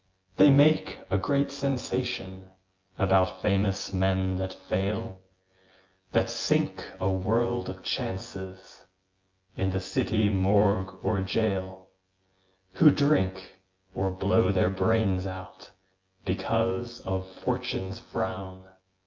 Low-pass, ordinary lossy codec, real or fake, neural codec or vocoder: 7.2 kHz; Opus, 24 kbps; fake; vocoder, 24 kHz, 100 mel bands, Vocos